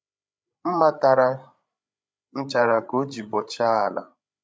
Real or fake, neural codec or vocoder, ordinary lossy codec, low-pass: fake; codec, 16 kHz, 8 kbps, FreqCodec, larger model; none; none